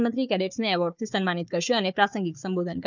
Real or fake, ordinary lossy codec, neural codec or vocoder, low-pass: fake; none; codec, 16 kHz, 4 kbps, FunCodec, trained on Chinese and English, 50 frames a second; 7.2 kHz